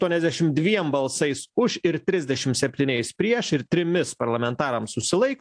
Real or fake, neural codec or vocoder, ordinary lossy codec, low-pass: real; none; AAC, 64 kbps; 9.9 kHz